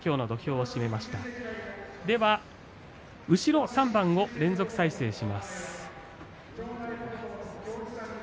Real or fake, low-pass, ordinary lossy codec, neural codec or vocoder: real; none; none; none